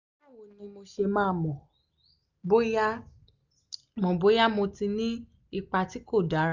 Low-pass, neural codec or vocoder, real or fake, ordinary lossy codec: 7.2 kHz; none; real; none